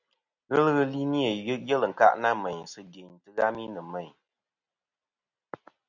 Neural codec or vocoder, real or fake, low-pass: none; real; 7.2 kHz